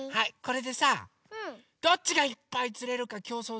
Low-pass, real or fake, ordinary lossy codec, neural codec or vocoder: none; real; none; none